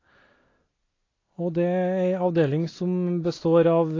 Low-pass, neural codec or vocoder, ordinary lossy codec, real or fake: 7.2 kHz; none; AAC, 32 kbps; real